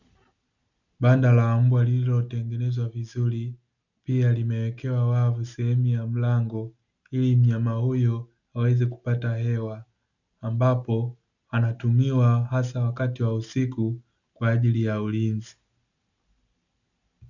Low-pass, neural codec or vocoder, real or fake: 7.2 kHz; none; real